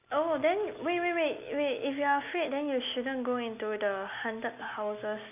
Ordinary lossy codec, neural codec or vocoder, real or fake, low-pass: none; none; real; 3.6 kHz